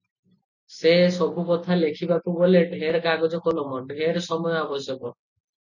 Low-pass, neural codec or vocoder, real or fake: 7.2 kHz; none; real